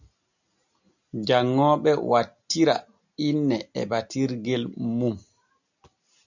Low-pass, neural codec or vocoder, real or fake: 7.2 kHz; none; real